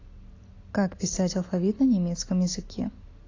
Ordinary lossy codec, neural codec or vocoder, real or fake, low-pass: AAC, 32 kbps; none; real; 7.2 kHz